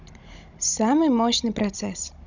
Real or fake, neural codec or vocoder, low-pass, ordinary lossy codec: fake; codec, 16 kHz, 16 kbps, FunCodec, trained on Chinese and English, 50 frames a second; 7.2 kHz; none